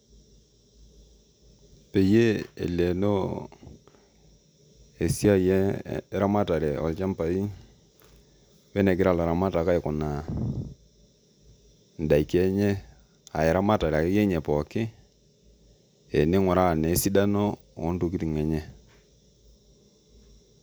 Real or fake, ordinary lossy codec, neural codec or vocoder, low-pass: real; none; none; none